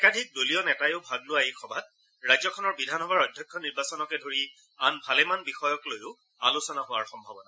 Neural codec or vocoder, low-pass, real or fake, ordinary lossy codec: none; none; real; none